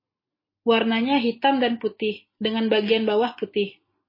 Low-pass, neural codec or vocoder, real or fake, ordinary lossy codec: 5.4 kHz; none; real; MP3, 24 kbps